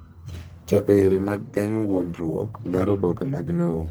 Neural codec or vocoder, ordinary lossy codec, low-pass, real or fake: codec, 44.1 kHz, 1.7 kbps, Pupu-Codec; none; none; fake